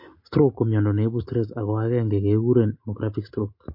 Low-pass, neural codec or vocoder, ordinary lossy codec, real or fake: 5.4 kHz; none; MP3, 32 kbps; real